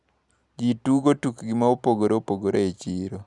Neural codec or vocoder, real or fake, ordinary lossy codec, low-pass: none; real; none; 10.8 kHz